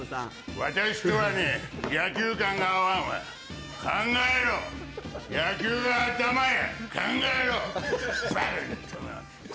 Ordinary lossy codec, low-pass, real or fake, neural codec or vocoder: none; none; real; none